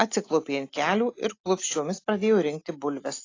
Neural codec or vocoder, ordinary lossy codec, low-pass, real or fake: none; AAC, 32 kbps; 7.2 kHz; real